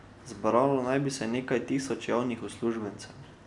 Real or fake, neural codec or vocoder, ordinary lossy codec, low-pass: real; none; none; 10.8 kHz